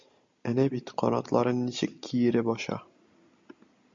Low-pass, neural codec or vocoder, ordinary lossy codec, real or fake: 7.2 kHz; none; MP3, 48 kbps; real